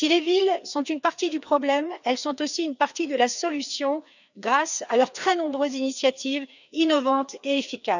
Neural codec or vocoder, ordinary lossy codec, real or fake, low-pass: codec, 16 kHz, 2 kbps, FreqCodec, larger model; none; fake; 7.2 kHz